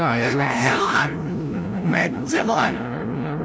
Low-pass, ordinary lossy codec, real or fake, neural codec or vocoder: none; none; fake; codec, 16 kHz, 0.5 kbps, FunCodec, trained on LibriTTS, 25 frames a second